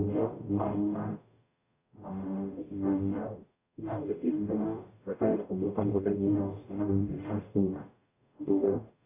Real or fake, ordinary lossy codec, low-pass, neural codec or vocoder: fake; AAC, 32 kbps; 3.6 kHz; codec, 44.1 kHz, 0.9 kbps, DAC